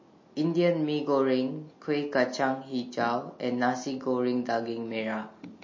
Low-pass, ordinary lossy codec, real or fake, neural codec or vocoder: 7.2 kHz; MP3, 32 kbps; real; none